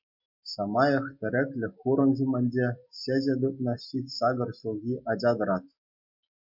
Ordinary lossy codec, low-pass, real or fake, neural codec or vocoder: MP3, 48 kbps; 5.4 kHz; real; none